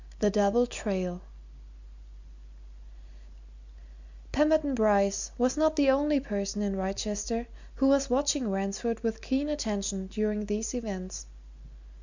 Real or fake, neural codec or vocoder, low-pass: real; none; 7.2 kHz